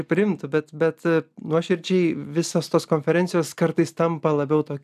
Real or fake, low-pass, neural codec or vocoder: real; 14.4 kHz; none